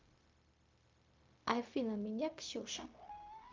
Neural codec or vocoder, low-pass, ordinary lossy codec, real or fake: codec, 16 kHz, 0.4 kbps, LongCat-Audio-Codec; 7.2 kHz; Opus, 24 kbps; fake